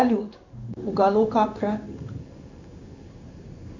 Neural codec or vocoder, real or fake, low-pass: codec, 16 kHz in and 24 kHz out, 1 kbps, XY-Tokenizer; fake; 7.2 kHz